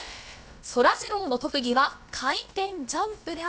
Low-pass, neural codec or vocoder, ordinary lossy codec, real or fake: none; codec, 16 kHz, about 1 kbps, DyCAST, with the encoder's durations; none; fake